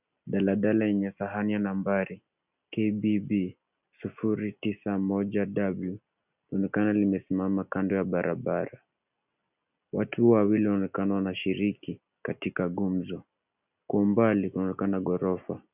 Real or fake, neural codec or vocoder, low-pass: real; none; 3.6 kHz